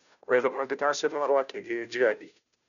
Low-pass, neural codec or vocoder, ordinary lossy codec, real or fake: 7.2 kHz; codec, 16 kHz, 0.5 kbps, FunCodec, trained on Chinese and English, 25 frames a second; none; fake